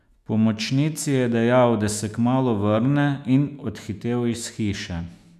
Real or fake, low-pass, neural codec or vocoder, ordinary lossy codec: fake; 14.4 kHz; autoencoder, 48 kHz, 128 numbers a frame, DAC-VAE, trained on Japanese speech; none